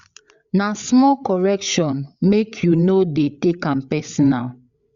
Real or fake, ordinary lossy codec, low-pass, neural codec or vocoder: fake; Opus, 64 kbps; 7.2 kHz; codec, 16 kHz, 8 kbps, FreqCodec, larger model